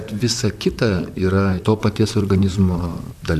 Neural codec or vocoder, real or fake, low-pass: vocoder, 44.1 kHz, 128 mel bands, Pupu-Vocoder; fake; 14.4 kHz